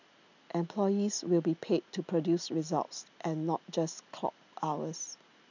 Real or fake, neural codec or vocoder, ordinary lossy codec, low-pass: real; none; none; 7.2 kHz